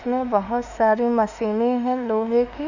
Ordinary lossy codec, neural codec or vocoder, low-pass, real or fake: none; autoencoder, 48 kHz, 32 numbers a frame, DAC-VAE, trained on Japanese speech; 7.2 kHz; fake